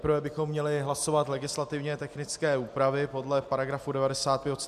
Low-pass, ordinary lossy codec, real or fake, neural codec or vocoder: 14.4 kHz; MP3, 96 kbps; real; none